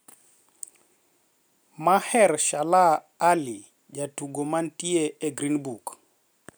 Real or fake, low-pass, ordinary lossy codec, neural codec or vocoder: real; none; none; none